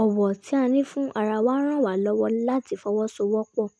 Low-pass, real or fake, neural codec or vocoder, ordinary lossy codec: none; real; none; none